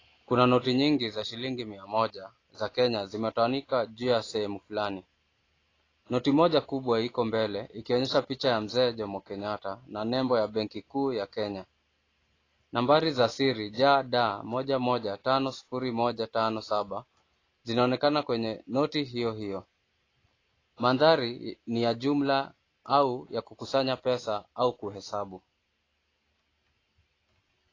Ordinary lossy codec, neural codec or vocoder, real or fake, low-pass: AAC, 32 kbps; none; real; 7.2 kHz